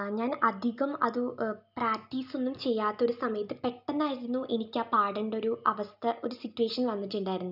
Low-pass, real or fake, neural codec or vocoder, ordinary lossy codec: 5.4 kHz; real; none; none